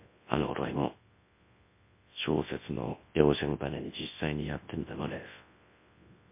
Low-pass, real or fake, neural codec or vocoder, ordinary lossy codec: 3.6 kHz; fake; codec, 24 kHz, 0.9 kbps, WavTokenizer, large speech release; MP3, 24 kbps